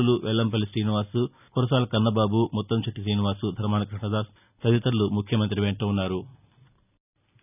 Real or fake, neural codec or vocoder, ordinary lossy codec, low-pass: real; none; none; 3.6 kHz